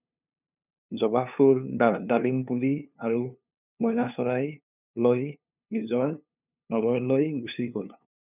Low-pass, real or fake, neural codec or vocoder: 3.6 kHz; fake; codec, 16 kHz, 2 kbps, FunCodec, trained on LibriTTS, 25 frames a second